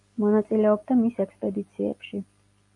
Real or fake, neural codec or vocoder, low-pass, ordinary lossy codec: real; none; 10.8 kHz; AAC, 64 kbps